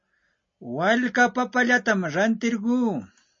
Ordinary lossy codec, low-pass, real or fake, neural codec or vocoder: MP3, 32 kbps; 7.2 kHz; real; none